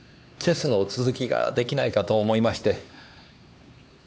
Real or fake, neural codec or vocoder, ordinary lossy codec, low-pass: fake; codec, 16 kHz, 2 kbps, X-Codec, HuBERT features, trained on LibriSpeech; none; none